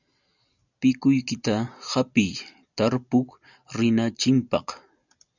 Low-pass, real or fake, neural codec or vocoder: 7.2 kHz; real; none